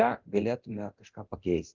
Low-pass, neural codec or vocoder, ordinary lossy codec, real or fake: 7.2 kHz; codec, 24 kHz, 0.9 kbps, DualCodec; Opus, 32 kbps; fake